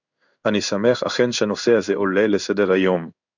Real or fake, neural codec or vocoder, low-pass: fake; codec, 16 kHz in and 24 kHz out, 1 kbps, XY-Tokenizer; 7.2 kHz